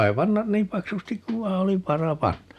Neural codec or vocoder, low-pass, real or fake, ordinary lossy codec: none; 14.4 kHz; real; none